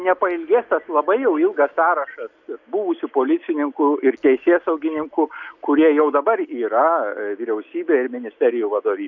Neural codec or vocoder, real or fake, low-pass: none; real; 7.2 kHz